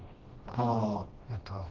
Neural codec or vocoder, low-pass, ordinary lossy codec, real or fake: codec, 16 kHz, 1 kbps, FreqCodec, smaller model; 7.2 kHz; Opus, 16 kbps; fake